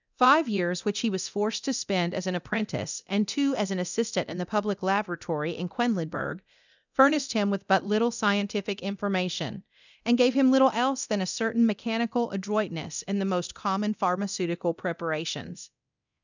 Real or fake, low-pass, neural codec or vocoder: fake; 7.2 kHz; codec, 24 kHz, 0.9 kbps, DualCodec